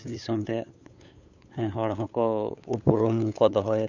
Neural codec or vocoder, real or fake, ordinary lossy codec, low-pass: codec, 16 kHz, 8 kbps, FunCodec, trained on LibriTTS, 25 frames a second; fake; none; 7.2 kHz